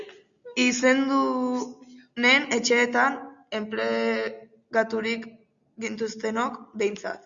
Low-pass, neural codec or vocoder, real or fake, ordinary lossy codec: 7.2 kHz; none; real; Opus, 64 kbps